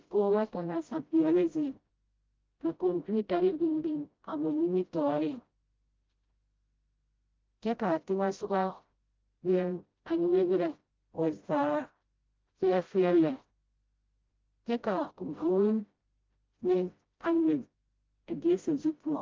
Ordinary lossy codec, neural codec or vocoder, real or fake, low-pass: Opus, 32 kbps; codec, 16 kHz, 0.5 kbps, FreqCodec, smaller model; fake; 7.2 kHz